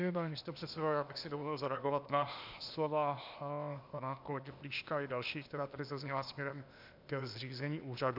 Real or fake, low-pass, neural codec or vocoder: fake; 5.4 kHz; codec, 16 kHz, 0.8 kbps, ZipCodec